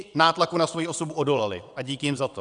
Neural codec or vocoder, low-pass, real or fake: vocoder, 22.05 kHz, 80 mel bands, WaveNeXt; 9.9 kHz; fake